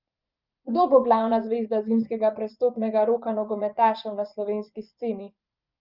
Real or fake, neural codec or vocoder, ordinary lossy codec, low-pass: fake; vocoder, 24 kHz, 100 mel bands, Vocos; Opus, 32 kbps; 5.4 kHz